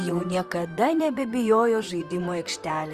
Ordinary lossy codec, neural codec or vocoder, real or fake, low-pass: Opus, 32 kbps; vocoder, 44.1 kHz, 128 mel bands, Pupu-Vocoder; fake; 14.4 kHz